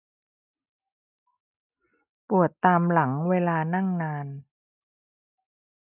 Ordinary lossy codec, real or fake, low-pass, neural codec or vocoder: none; real; 3.6 kHz; none